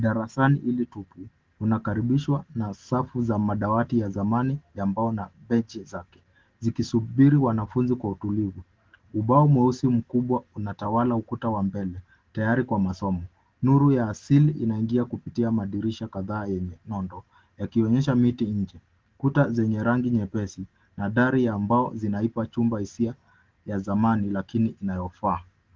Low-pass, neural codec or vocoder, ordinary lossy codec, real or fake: 7.2 kHz; none; Opus, 16 kbps; real